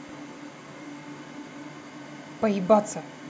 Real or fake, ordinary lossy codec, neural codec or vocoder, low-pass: real; none; none; none